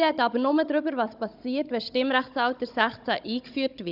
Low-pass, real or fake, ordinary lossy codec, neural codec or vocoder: 5.4 kHz; fake; none; codec, 16 kHz, 16 kbps, FunCodec, trained on Chinese and English, 50 frames a second